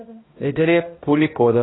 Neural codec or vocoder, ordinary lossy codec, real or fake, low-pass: codec, 16 kHz, 0.5 kbps, X-Codec, HuBERT features, trained on balanced general audio; AAC, 16 kbps; fake; 7.2 kHz